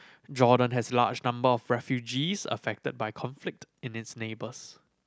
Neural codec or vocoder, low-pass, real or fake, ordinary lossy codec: none; none; real; none